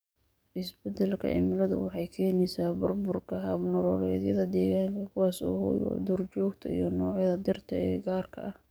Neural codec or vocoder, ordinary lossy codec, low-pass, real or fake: codec, 44.1 kHz, 7.8 kbps, DAC; none; none; fake